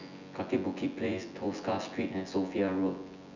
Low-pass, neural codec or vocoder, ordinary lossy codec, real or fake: 7.2 kHz; vocoder, 24 kHz, 100 mel bands, Vocos; none; fake